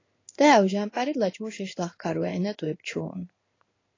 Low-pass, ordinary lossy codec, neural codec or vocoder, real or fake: 7.2 kHz; AAC, 32 kbps; codec, 16 kHz in and 24 kHz out, 1 kbps, XY-Tokenizer; fake